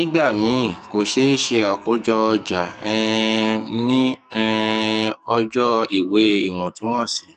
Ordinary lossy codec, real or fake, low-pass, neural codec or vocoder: none; fake; 14.4 kHz; codec, 44.1 kHz, 2.6 kbps, SNAC